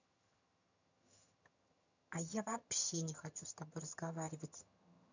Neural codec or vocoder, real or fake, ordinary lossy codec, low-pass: vocoder, 22.05 kHz, 80 mel bands, HiFi-GAN; fake; none; 7.2 kHz